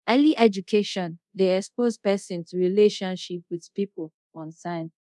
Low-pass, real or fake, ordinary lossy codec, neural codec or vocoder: none; fake; none; codec, 24 kHz, 0.5 kbps, DualCodec